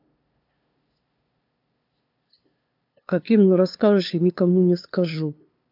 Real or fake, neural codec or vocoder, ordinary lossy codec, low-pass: fake; codec, 16 kHz, 2 kbps, FunCodec, trained on LibriTTS, 25 frames a second; MP3, 48 kbps; 5.4 kHz